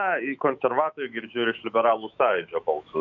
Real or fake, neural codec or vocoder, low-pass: fake; autoencoder, 48 kHz, 128 numbers a frame, DAC-VAE, trained on Japanese speech; 7.2 kHz